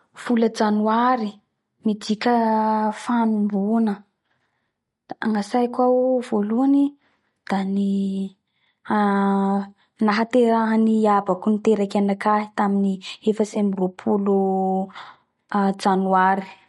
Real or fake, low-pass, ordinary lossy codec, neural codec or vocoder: real; 19.8 kHz; MP3, 48 kbps; none